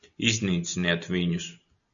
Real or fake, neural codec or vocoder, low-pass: real; none; 7.2 kHz